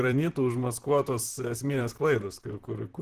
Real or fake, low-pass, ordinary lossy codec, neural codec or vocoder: fake; 14.4 kHz; Opus, 16 kbps; vocoder, 44.1 kHz, 128 mel bands, Pupu-Vocoder